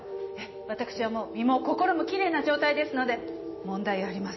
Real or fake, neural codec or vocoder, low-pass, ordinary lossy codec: real; none; 7.2 kHz; MP3, 24 kbps